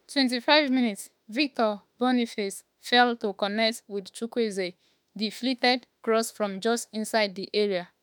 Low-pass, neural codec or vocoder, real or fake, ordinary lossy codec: none; autoencoder, 48 kHz, 32 numbers a frame, DAC-VAE, trained on Japanese speech; fake; none